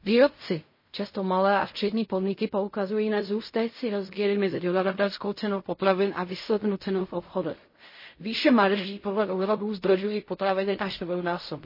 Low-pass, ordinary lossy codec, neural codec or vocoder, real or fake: 5.4 kHz; MP3, 24 kbps; codec, 16 kHz in and 24 kHz out, 0.4 kbps, LongCat-Audio-Codec, fine tuned four codebook decoder; fake